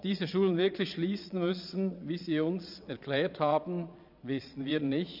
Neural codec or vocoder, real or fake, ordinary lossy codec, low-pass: vocoder, 22.05 kHz, 80 mel bands, Vocos; fake; none; 5.4 kHz